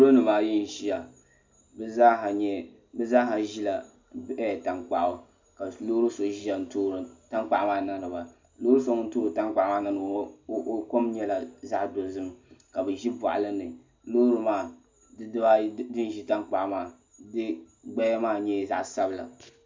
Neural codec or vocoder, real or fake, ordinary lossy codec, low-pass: none; real; MP3, 64 kbps; 7.2 kHz